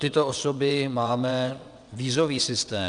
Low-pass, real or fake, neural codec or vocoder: 9.9 kHz; fake; vocoder, 22.05 kHz, 80 mel bands, WaveNeXt